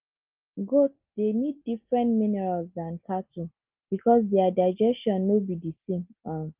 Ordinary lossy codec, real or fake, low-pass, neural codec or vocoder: Opus, 32 kbps; real; 3.6 kHz; none